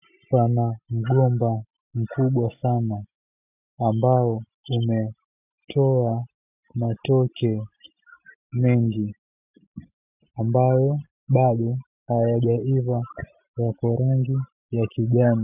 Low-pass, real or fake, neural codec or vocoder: 3.6 kHz; real; none